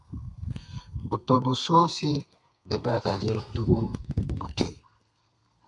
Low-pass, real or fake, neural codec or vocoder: 10.8 kHz; fake; codec, 32 kHz, 1.9 kbps, SNAC